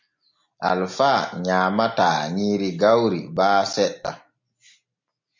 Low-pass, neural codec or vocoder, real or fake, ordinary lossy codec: 7.2 kHz; none; real; MP3, 48 kbps